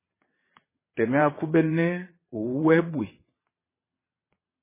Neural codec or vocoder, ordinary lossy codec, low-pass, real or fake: vocoder, 22.05 kHz, 80 mel bands, WaveNeXt; MP3, 16 kbps; 3.6 kHz; fake